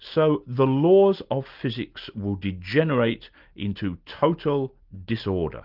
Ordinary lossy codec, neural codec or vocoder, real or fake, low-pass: Opus, 24 kbps; none; real; 5.4 kHz